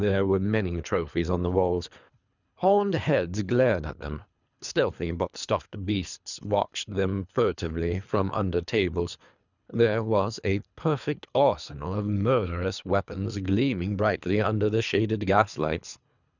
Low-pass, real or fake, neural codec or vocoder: 7.2 kHz; fake; codec, 24 kHz, 3 kbps, HILCodec